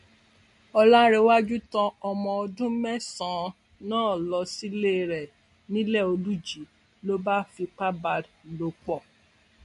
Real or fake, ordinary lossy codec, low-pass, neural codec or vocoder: real; MP3, 48 kbps; 14.4 kHz; none